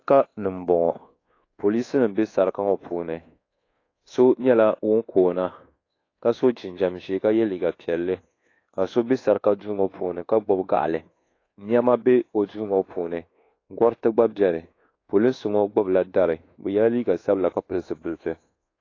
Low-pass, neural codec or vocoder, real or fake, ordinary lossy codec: 7.2 kHz; codec, 24 kHz, 1.2 kbps, DualCodec; fake; AAC, 32 kbps